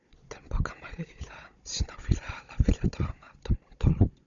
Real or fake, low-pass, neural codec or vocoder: fake; 7.2 kHz; codec, 16 kHz, 16 kbps, FunCodec, trained on Chinese and English, 50 frames a second